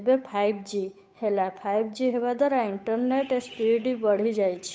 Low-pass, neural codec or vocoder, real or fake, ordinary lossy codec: none; codec, 16 kHz, 8 kbps, FunCodec, trained on Chinese and English, 25 frames a second; fake; none